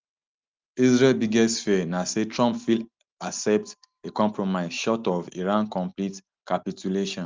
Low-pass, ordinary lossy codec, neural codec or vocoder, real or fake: 7.2 kHz; Opus, 32 kbps; none; real